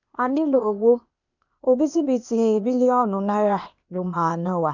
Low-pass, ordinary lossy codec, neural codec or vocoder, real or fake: 7.2 kHz; none; codec, 16 kHz, 0.8 kbps, ZipCodec; fake